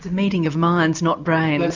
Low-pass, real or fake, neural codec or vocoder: 7.2 kHz; real; none